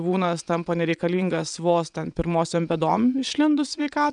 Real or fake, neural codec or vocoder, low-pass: fake; vocoder, 22.05 kHz, 80 mel bands, WaveNeXt; 9.9 kHz